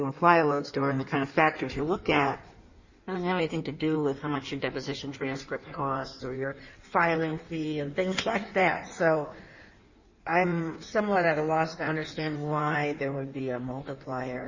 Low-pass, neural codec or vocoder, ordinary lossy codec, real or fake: 7.2 kHz; codec, 16 kHz in and 24 kHz out, 1.1 kbps, FireRedTTS-2 codec; Opus, 64 kbps; fake